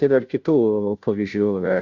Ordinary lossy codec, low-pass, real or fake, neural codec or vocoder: AAC, 48 kbps; 7.2 kHz; fake; codec, 16 kHz, 0.5 kbps, FunCodec, trained on Chinese and English, 25 frames a second